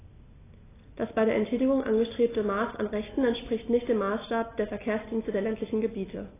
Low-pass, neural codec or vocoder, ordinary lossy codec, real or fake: 3.6 kHz; none; AAC, 16 kbps; real